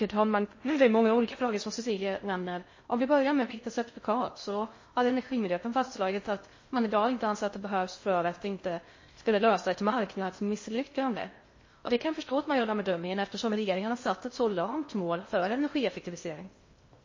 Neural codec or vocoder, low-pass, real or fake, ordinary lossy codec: codec, 16 kHz in and 24 kHz out, 0.6 kbps, FocalCodec, streaming, 2048 codes; 7.2 kHz; fake; MP3, 32 kbps